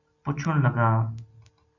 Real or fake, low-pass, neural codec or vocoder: real; 7.2 kHz; none